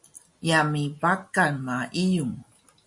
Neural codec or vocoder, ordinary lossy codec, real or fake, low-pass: none; MP3, 48 kbps; real; 10.8 kHz